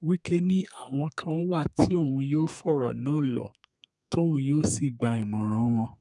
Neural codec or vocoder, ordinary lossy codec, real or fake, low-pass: codec, 32 kHz, 1.9 kbps, SNAC; none; fake; 10.8 kHz